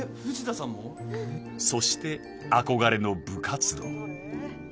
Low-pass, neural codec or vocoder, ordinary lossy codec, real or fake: none; none; none; real